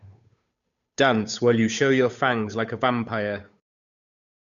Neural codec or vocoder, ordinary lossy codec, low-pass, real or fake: codec, 16 kHz, 8 kbps, FunCodec, trained on Chinese and English, 25 frames a second; none; 7.2 kHz; fake